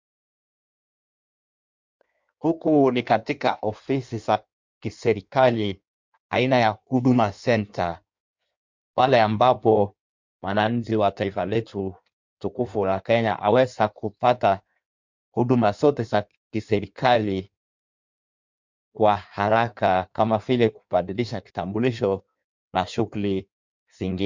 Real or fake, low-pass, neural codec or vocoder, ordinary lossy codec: fake; 7.2 kHz; codec, 16 kHz in and 24 kHz out, 1.1 kbps, FireRedTTS-2 codec; MP3, 64 kbps